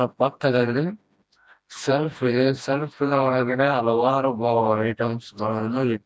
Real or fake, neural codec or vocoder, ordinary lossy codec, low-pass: fake; codec, 16 kHz, 1 kbps, FreqCodec, smaller model; none; none